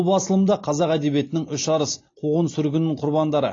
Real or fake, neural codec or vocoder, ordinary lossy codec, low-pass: real; none; AAC, 32 kbps; 7.2 kHz